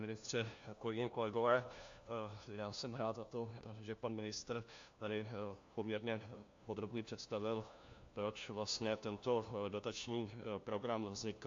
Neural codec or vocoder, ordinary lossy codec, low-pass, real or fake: codec, 16 kHz, 1 kbps, FunCodec, trained on LibriTTS, 50 frames a second; AAC, 48 kbps; 7.2 kHz; fake